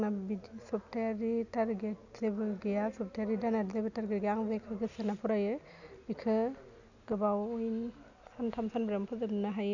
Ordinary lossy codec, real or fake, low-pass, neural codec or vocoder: none; real; 7.2 kHz; none